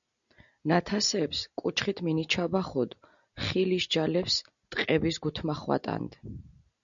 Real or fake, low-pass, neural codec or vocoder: real; 7.2 kHz; none